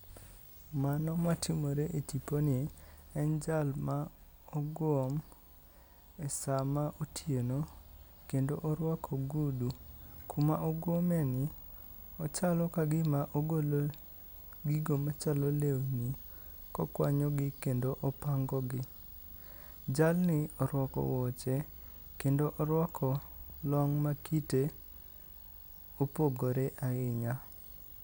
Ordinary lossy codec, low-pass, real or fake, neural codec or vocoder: none; none; real; none